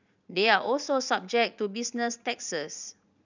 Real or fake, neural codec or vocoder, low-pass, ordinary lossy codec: fake; vocoder, 44.1 kHz, 80 mel bands, Vocos; 7.2 kHz; none